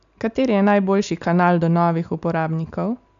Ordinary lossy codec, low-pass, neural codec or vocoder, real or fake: none; 7.2 kHz; none; real